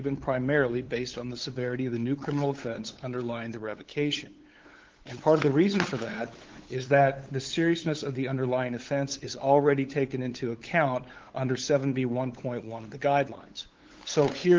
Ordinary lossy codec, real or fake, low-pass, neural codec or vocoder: Opus, 16 kbps; fake; 7.2 kHz; codec, 16 kHz, 8 kbps, FunCodec, trained on LibriTTS, 25 frames a second